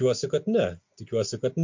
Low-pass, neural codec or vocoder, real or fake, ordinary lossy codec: 7.2 kHz; none; real; MP3, 48 kbps